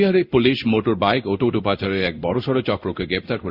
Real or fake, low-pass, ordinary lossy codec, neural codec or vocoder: fake; 5.4 kHz; Opus, 64 kbps; codec, 16 kHz in and 24 kHz out, 1 kbps, XY-Tokenizer